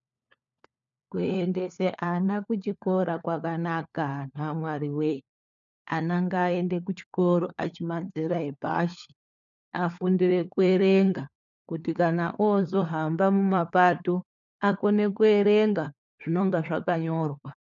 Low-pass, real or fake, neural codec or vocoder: 7.2 kHz; fake; codec, 16 kHz, 4 kbps, FunCodec, trained on LibriTTS, 50 frames a second